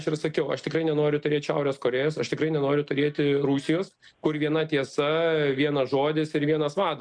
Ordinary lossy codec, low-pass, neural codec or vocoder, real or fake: MP3, 96 kbps; 9.9 kHz; none; real